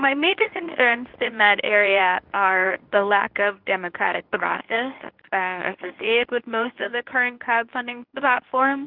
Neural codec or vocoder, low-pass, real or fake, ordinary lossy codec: codec, 24 kHz, 0.9 kbps, WavTokenizer, medium speech release version 1; 5.4 kHz; fake; Opus, 32 kbps